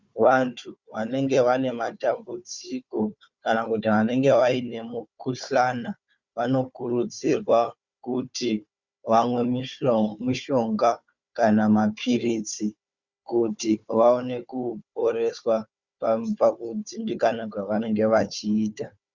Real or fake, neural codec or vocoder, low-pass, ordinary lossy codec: fake; codec, 16 kHz, 4 kbps, FunCodec, trained on Chinese and English, 50 frames a second; 7.2 kHz; Opus, 64 kbps